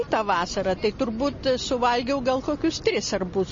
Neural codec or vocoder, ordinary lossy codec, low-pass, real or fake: none; MP3, 32 kbps; 7.2 kHz; real